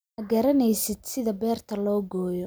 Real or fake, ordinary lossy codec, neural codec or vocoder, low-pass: real; none; none; none